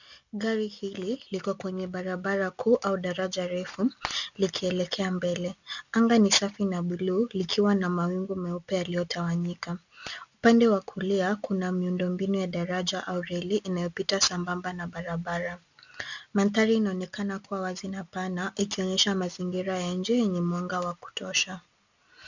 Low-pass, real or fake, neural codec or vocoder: 7.2 kHz; real; none